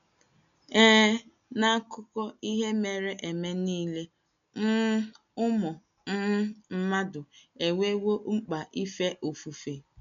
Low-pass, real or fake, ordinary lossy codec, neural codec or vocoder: 7.2 kHz; real; none; none